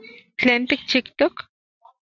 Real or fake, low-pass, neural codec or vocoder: real; 7.2 kHz; none